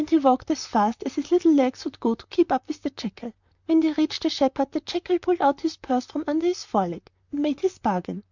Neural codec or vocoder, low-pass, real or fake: vocoder, 44.1 kHz, 128 mel bands, Pupu-Vocoder; 7.2 kHz; fake